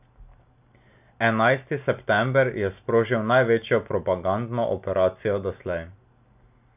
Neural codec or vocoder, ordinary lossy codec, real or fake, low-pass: none; none; real; 3.6 kHz